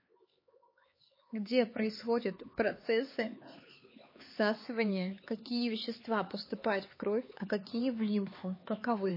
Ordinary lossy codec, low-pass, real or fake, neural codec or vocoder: MP3, 24 kbps; 5.4 kHz; fake; codec, 16 kHz, 4 kbps, X-Codec, HuBERT features, trained on LibriSpeech